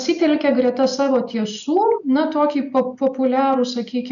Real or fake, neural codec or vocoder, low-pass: real; none; 7.2 kHz